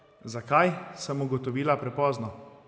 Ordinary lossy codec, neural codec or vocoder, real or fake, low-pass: none; none; real; none